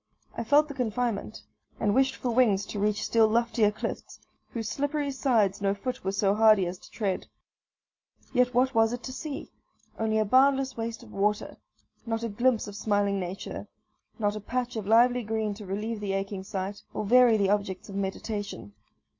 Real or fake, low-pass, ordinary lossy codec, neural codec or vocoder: real; 7.2 kHz; MP3, 48 kbps; none